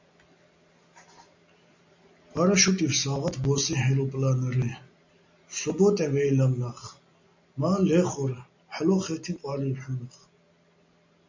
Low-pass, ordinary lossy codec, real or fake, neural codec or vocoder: 7.2 kHz; MP3, 64 kbps; real; none